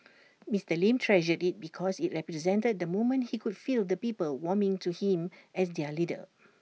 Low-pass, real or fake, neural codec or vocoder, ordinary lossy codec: none; real; none; none